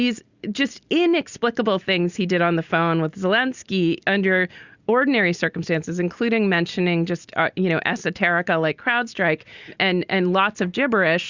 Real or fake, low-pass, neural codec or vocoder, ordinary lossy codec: real; 7.2 kHz; none; Opus, 64 kbps